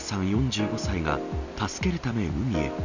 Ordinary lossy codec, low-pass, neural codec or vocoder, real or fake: none; 7.2 kHz; none; real